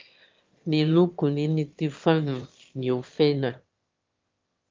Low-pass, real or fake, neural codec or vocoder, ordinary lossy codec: 7.2 kHz; fake; autoencoder, 22.05 kHz, a latent of 192 numbers a frame, VITS, trained on one speaker; Opus, 32 kbps